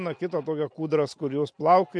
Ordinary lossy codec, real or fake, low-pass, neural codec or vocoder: MP3, 64 kbps; real; 9.9 kHz; none